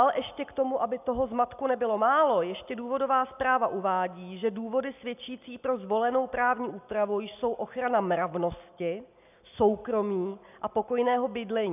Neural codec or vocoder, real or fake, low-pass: none; real; 3.6 kHz